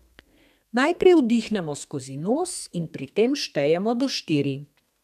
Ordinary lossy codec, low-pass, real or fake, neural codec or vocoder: none; 14.4 kHz; fake; codec, 32 kHz, 1.9 kbps, SNAC